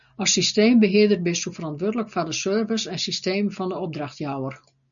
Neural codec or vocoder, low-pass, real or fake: none; 7.2 kHz; real